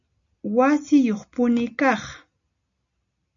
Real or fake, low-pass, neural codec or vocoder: real; 7.2 kHz; none